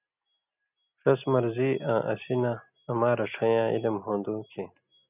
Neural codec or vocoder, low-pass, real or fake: none; 3.6 kHz; real